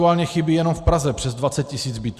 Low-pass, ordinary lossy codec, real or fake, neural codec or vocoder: 14.4 kHz; Opus, 64 kbps; real; none